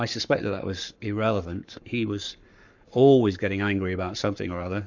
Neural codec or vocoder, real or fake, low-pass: codec, 44.1 kHz, 7.8 kbps, Pupu-Codec; fake; 7.2 kHz